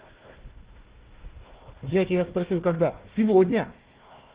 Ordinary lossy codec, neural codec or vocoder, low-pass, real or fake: Opus, 16 kbps; codec, 16 kHz, 1 kbps, FunCodec, trained on Chinese and English, 50 frames a second; 3.6 kHz; fake